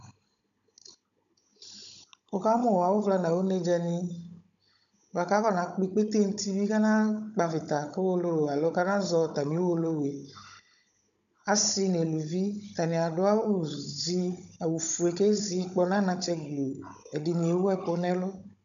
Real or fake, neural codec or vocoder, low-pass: fake; codec, 16 kHz, 16 kbps, FunCodec, trained on Chinese and English, 50 frames a second; 7.2 kHz